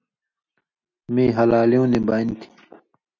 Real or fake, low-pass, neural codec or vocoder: real; 7.2 kHz; none